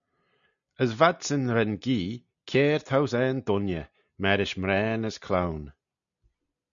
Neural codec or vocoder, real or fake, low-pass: none; real; 7.2 kHz